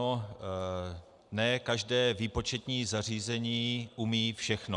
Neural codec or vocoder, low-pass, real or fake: none; 10.8 kHz; real